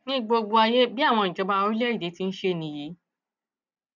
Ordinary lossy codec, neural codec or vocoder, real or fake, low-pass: none; none; real; 7.2 kHz